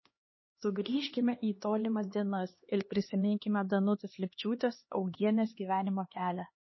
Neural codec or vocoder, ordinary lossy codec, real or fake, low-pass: codec, 16 kHz, 2 kbps, X-Codec, HuBERT features, trained on LibriSpeech; MP3, 24 kbps; fake; 7.2 kHz